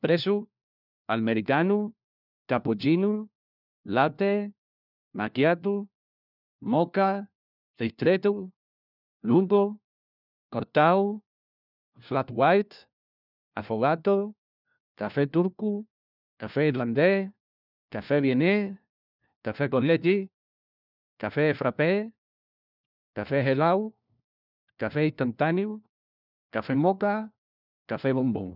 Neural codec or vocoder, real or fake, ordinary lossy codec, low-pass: codec, 16 kHz, 1 kbps, FunCodec, trained on LibriTTS, 50 frames a second; fake; none; 5.4 kHz